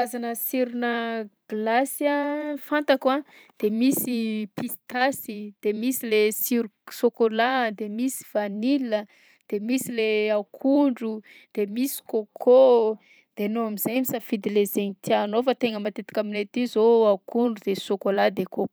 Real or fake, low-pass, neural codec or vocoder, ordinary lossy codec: fake; none; vocoder, 44.1 kHz, 128 mel bands every 512 samples, BigVGAN v2; none